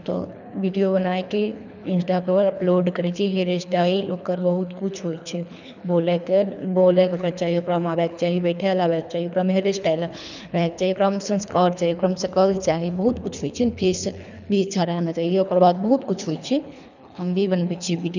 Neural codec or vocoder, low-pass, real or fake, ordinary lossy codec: codec, 24 kHz, 3 kbps, HILCodec; 7.2 kHz; fake; none